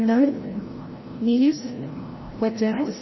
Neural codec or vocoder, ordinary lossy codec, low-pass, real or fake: codec, 16 kHz, 0.5 kbps, FreqCodec, larger model; MP3, 24 kbps; 7.2 kHz; fake